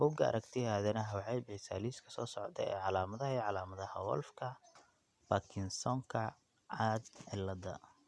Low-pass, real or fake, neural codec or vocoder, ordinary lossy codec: none; real; none; none